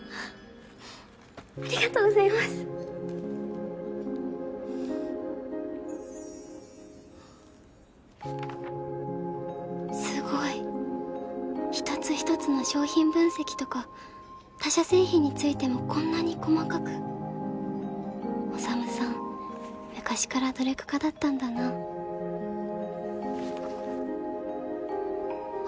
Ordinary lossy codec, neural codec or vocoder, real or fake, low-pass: none; none; real; none